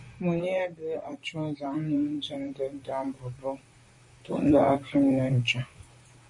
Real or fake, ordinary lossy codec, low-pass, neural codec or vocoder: fake; MP3, 48 kbps; 10.8 kHz; vocoder, 44.1 kHz, 128 mel bands, Pupu-Vocoder